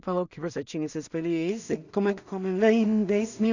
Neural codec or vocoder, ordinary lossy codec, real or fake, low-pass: codec, 16 kHz in and 24 kHz out, 0.4 kbps, LongCat-Audio-Codec, two codebook decoder; none; fake; 7.2 kHz